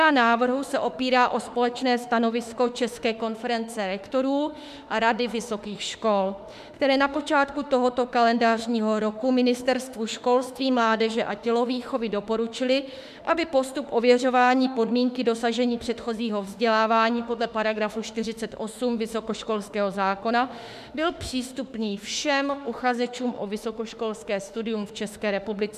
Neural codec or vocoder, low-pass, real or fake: autoencoder, 48 kHz, 32 numbers a frame, DAC-VAE, trained on Japanese speech; 14.4 kHz; fake